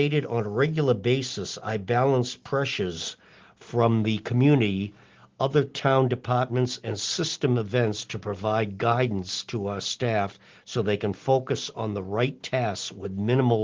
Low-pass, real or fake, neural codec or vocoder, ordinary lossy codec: 7.2 kHz; real; none; Opus, 16 kbps